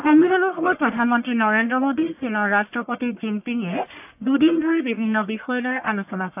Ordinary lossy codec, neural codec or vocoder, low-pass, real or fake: AAC, 32 kbps; codec, 44.1 kHz, 1.7 kbps, Pupu-Codec; 3.6 kHz; fake